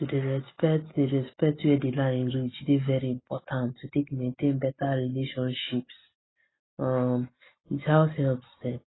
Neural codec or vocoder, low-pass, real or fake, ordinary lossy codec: none; 7.2 kHz; real; AAC, 16 kbps